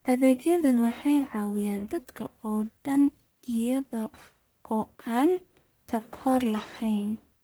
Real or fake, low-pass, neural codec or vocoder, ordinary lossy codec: fake; none; codec, 44.1 kHz, 1.7 kbps, Pupu-Codec; none